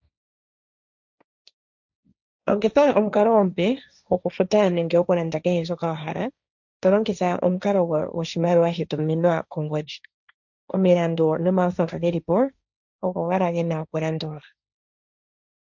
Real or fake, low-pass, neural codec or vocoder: fake; 7.2 kHz; codec, 16 kHz, 1.1 kbps, Voila-Tokenizer